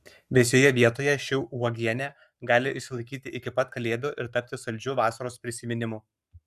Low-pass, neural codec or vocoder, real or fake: 14.4 kHz; codec, 44.1 kHz, 7.8 kbps, Pupu-Codec; fake